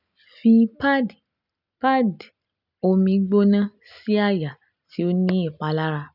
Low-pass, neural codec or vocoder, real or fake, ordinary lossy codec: 5.4 kHz; none; real; none